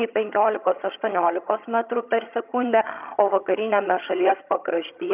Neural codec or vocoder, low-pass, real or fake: vocoder, 22.05 kHz, 80 mel bands, HiFi-GAN; 3.6 kHz; fake